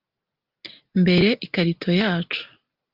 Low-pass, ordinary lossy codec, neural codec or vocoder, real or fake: 5.4 kHz; Opus, 32 kbps; none; real